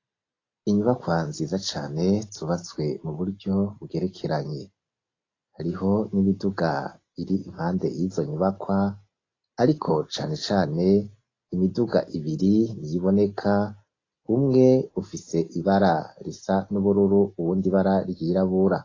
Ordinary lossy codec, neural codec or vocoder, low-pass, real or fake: AAC, 32 kbps; none; 7.2 kHz; real